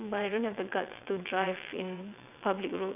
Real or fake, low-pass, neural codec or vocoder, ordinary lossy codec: fake; 3.6 kHz; vocoder, 22.05 kHz, 80 mel bands, WaveNeXt; none